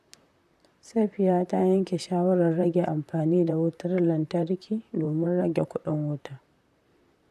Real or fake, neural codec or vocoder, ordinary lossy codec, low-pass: fake; vocoder, 44.1 kHz, 128 mel bands, Pupu-Vocoder; none; 14.4 kHz